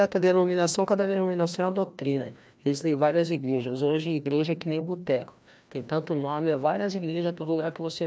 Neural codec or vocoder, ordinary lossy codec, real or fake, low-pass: codec, 16 kHz, 1 kbps, FreqCodec, larger model; none; fake; none